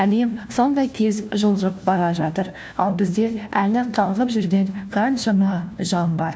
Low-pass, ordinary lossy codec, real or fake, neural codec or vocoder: none; none; fake; codec, 16 kHz, 1 kbps, FunCodec, trained on LibriTTS, 50 frames a second